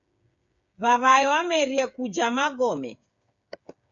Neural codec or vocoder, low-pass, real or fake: codec, 16 kHz, 8 kbps, FreqCodec, smaller model; 7.2 kHz; fake